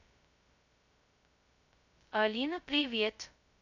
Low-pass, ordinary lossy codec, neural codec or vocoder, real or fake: 7.2 kHz; none; codec, 16 kHz, 0.2 kbps, FocalCodec; fake